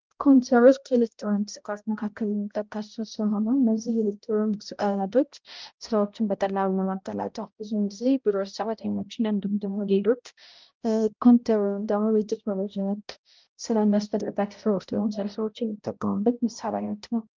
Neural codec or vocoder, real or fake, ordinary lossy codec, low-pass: codec, 16 kHz, 0.5 kbps, X-Codec, HuBERT features, trained on balanced general audio; fake; Opus, 32 kbps; 7.2 kHz